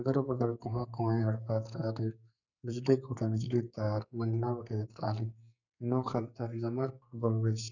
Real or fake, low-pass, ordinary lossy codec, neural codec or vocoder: fake; 7.2 kHz; none; codec, 44.1 kHz, 2.6 kbps, SNAC